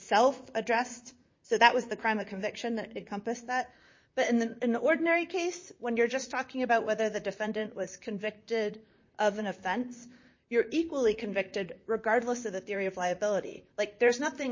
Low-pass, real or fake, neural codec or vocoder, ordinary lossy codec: 7.2 kHz; fake; vocoder, 44.1 kHz, 128 mel bands, Pupu-Vocoder; MP3, 32 kbps